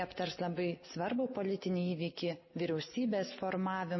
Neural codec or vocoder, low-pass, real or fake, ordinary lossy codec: none; 7.2 kHz; real; MP3, 24 kbps